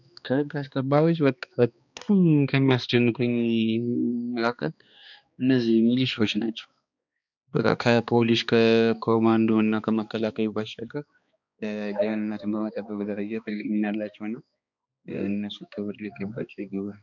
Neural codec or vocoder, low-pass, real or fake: codec, 16 kHz, 2 kbps, X-Codec, HuBERT features, trained on balanced general audio; 7.2 kHz; fake